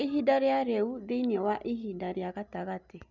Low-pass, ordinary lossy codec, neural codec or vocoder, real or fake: 7.2 kHz; none; none; real